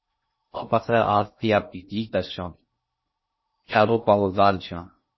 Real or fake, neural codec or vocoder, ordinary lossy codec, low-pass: fake; codec, 16 kHz in and 24 kHz out, 0.6 kbps, FocalCodec, streaming, 2048 codes; MP3, 24 kbps; 7.2 kHz